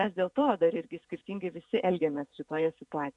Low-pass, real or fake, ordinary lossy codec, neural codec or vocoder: 10.8 kHz; fake; MP3, 64 kbps; vocoder, 48 kHz, 128 mel bands, Vocos